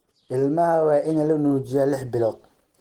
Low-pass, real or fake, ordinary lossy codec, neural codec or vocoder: 19.8 kHz; real; Opus, 16 kbps; none